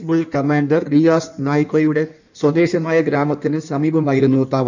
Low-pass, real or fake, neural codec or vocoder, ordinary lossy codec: 7.2 kHz; fake; codec, 16 kHz in and 24 kHz out, 1.1 kbps, FireRedTTS-2 codec; none